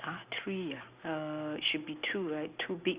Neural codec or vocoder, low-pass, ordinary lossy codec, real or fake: none; 3.6 kHz; Opus, 64 kbps; real